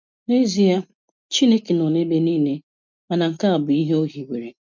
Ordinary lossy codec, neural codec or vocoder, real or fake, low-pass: MP3, 64 kbps; none; real; 7.2 kHz